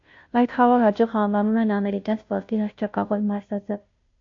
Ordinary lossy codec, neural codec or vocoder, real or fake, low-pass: AAC, 48 kbps; codec, 16 kHz, 0.5 kbps, FunCodec, trained on Chinese and English, 25 frames a second; fake; 7.2 kHz